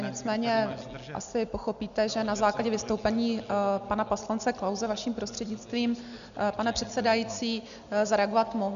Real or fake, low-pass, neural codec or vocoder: real; 7.2 kHz; none